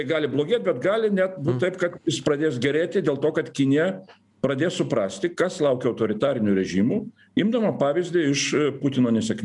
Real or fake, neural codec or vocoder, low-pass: real; none; 10.8 kHz